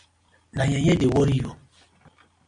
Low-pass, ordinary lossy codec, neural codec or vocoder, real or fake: 9.9 kHz; MP3, 64 kbps; none; real